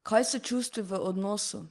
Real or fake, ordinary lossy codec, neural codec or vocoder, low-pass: real; Opus, 24 kbps; none; 10.8 kHz